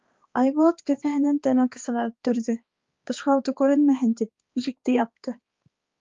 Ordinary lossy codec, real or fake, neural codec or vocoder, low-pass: Opus, 32 kbps; fake; codec, 16 kHz, 2 kbps, X-Codec, HuBERT features, trained on balanced general audio; 7.2 kHz